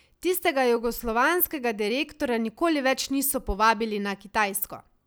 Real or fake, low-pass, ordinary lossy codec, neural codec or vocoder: real; none; none; none